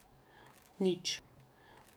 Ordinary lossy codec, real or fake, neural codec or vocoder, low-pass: none; fake; codec, 44.1 kHz, 7.8 kbps, DAC; none